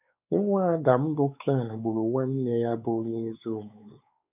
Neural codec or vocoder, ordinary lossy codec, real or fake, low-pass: codec, 16 kHz, 4 kbps, X-Codec, WavLM features, trained on Multilingual LibriSpeech; none; fake; 3.6 kHz